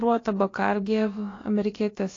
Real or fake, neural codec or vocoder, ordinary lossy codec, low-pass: fake; codec, 16 kHz, about 1 kbps, DyCAST, with the encoder's durations; AAC, 32 kbps; 7.2 kHz